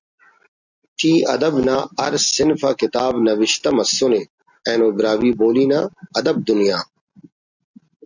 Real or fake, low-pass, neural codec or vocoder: real; 7.2 kHz; none